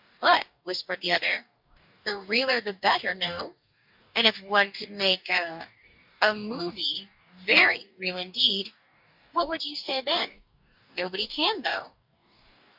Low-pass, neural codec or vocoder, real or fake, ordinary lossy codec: 5.4 kHz; codec, 44.1 kHz, 2.6 kbps, DAC; fake; MP3, 48 kbps